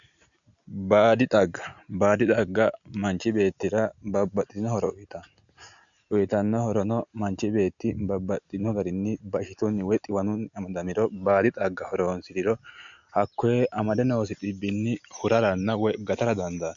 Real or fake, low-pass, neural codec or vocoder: real; 7.2 kHz; none